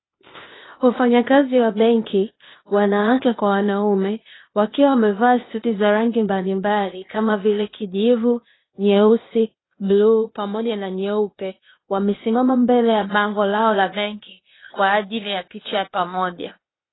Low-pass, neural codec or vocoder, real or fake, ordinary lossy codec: 7.2 kHz; codec, 16 kHz, 0.8 kbps, ZipCodec; fake; AAC, 16 kbps